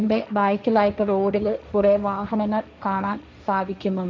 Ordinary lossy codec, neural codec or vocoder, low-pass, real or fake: none; codec, 16 kHz, 1.1 kbps, Voila-Tokenizer; none; fake